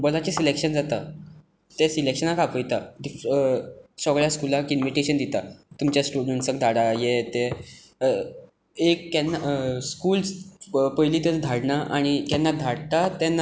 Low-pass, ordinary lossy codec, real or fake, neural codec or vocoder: none; none; real; none